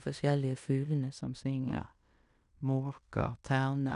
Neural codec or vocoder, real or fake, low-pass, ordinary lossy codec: codec, 16 kHz in and 24 kHz out, 0.9 kbps, LongCat-Audio-Codec, fine tuned four codebook decoder; fake; 10.8 kHz; none